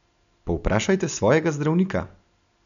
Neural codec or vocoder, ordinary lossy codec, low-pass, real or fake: none; none; 7.2 kHz; real